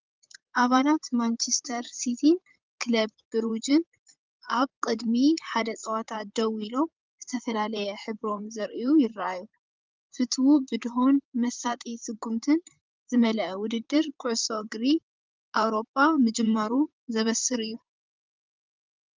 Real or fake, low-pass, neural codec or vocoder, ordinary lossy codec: fake; 7.2 kHz; vocoder, 44.1 kHz, 128 mel bands, Pupu-Vocoder; Opus, 32 kbps